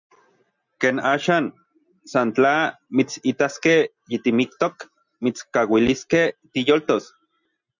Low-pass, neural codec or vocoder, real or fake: 7.2 kHz; none; real